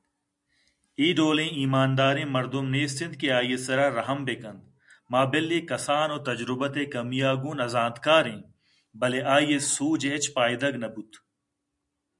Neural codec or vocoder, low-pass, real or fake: none; 10.8 kHz; real